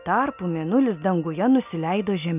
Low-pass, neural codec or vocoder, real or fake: 3.6 kHz; none; real